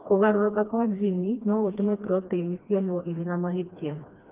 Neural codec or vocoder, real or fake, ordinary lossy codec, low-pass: codec, 16 kHz, 2 kbps, FreqCodec, smaller model; fake; Opus, 32 kbps; 3.6 kHz